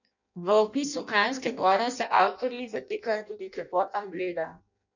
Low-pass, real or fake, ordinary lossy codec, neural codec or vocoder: 7.2 kHz; fake; none; codec, 16 kHz in and 24 kHz out, 0.6 kbps, FireRedTTS-2 codec